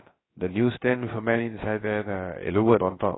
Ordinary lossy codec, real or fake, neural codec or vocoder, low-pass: AAC, 16 kbps; fake; codec, 16 kHz, about 1 kbps, DyCAST, with the encoder's durations; 7.2 kHz